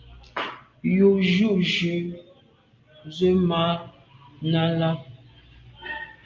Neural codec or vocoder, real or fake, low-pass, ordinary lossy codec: none; real; 7.2 kHz; Opus, 32 kbps